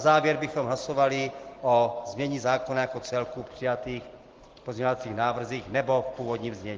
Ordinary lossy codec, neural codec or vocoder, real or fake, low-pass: Opus, 16 kbps; none; real; 7.2 kHz